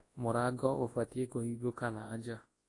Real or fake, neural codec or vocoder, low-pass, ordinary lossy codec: fake; codec, 24 kHz, 0.9 kbps, WavTokenizer, large speech release; 10.8 kHz; AAC, 32 kbps